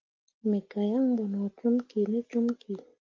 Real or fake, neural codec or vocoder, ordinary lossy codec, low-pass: real; none; Opus, 32 kbps; 7.2 kHz